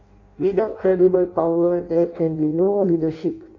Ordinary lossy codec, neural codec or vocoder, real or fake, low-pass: AAC, 48 kbps; codec, 16 kHz in and 24 kHz out, 0.6 kbps, FireRedTTS-2 codec; fake; 7.2 kHz